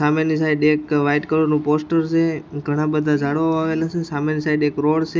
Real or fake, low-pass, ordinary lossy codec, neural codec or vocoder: real; none; none; none